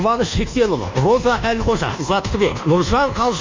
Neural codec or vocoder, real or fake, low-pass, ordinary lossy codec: codec, 24 kHz, 1.2 kbps, DualCodec; fake; 7.2 kHz; MP3, 64 kbps